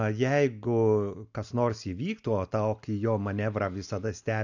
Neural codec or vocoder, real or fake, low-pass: none; real; 7.2 kHz